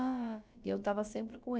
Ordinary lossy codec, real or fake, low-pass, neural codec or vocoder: none; fake; none; codec, 16 kHz, about 1 kbps, DyCAST, with the encoder's durations